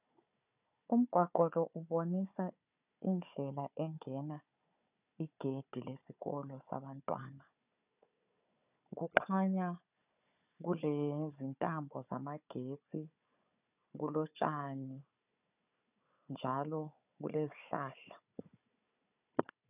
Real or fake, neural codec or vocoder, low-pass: fake; codec, 16 kHz, 4 kbps, FunCodec, trained on Chinese and English, 50 frames a second; 3.6 kHz